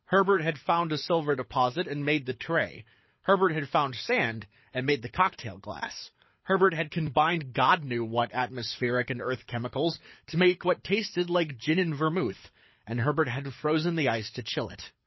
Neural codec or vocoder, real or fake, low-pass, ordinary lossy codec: codec, 24 kHz, 6 kbps, HILCodec; fake; 7.2 kHz; MP3, 24 kbps